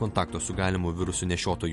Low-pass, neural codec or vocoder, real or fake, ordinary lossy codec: 14.4 kHz; none; real; MP3, 48 kbps